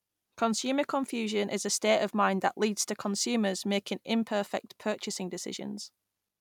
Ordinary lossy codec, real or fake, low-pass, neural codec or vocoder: none; real; 19.8 kHz; none